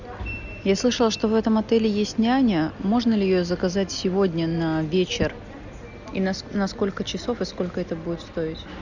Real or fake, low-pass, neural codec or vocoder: real; 7.2 kHz; none